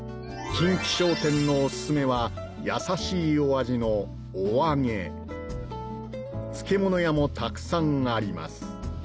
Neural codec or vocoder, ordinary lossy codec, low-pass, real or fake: none; none; none; real